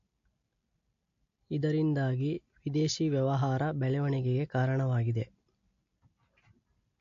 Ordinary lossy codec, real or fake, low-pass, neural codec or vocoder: AAC, 48 kbps; real; 7.2 kHz; none